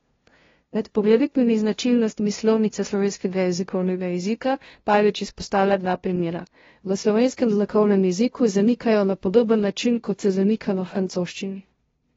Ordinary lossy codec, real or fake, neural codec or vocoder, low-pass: AAC, 24 kbps; fake; codec, 16 kHz, 0.5 kbps, FunCodec, trained on LibriTTS, 25 frames a second; 7.2 kHz